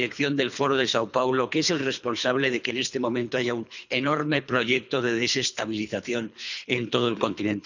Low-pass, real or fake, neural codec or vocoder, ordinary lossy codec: 7.2 kHz; fake; codec, 24 kHz, 3 kbps, HILCodec; none